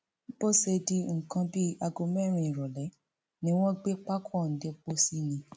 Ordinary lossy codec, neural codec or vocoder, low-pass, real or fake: none; none; none; real